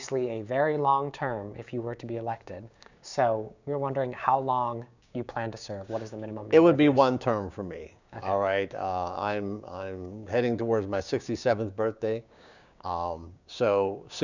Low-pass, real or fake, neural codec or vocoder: 7.2 kHz; fake; codec, 16 kHz, 6 kbps, DAC